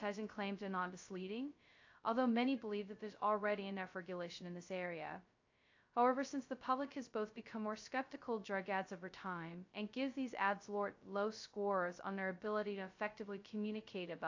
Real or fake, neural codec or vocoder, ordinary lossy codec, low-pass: fake; codec, 16 kHz, 0.2 kbps, FocalCodec; AAC, 48 kbps; 7.2 kHz